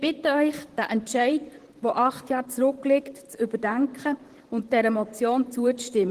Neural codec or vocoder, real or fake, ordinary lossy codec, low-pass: vocoder, 44.1 kHz, 128 mel bands, Pupu-Vocoder; fake; Opus, 16 kbps; 14.4 kHz